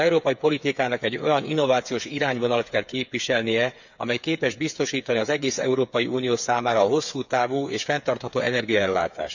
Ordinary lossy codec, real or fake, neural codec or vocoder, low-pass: none; fake; codec, 16 kHz, 8 kbps, FreqCodec, smaller model; 7.2 kHz